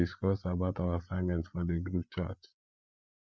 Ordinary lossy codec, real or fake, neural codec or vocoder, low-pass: none; fake; codec, 16 kHz, 8 kbps, FreqCodec, larger model; none